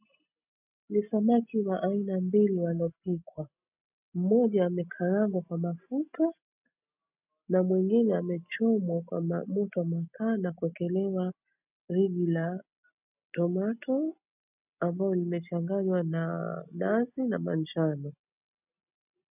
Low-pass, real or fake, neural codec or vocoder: 3.6 kHz; real; none